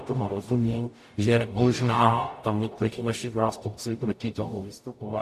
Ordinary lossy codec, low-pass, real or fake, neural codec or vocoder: AAC, 64 kbps; 14.4 kHz; fake; codec, 44.1 kHz, 0.9 kbps, DAC